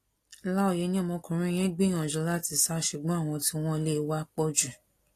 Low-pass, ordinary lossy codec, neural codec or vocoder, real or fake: 14.4 kHz; AAC, 48 kbps; none; real